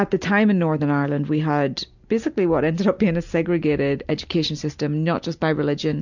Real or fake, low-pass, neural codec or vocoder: real; 7.2 kHz; none